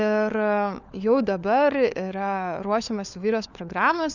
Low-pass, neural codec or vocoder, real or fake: 7.2 kHz; codec, 16 kHz, 4 kbps, FunCodec, trained on LibriTTS, 50 frames a second; fake